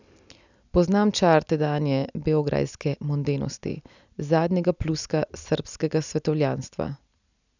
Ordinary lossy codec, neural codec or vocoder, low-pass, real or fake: none; none; 7.2 kHz; real